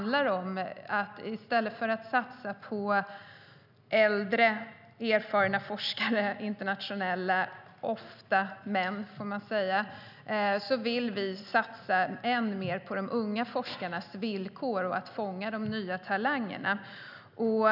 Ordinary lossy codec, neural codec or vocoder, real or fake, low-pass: none; none; real; 5.4 kHz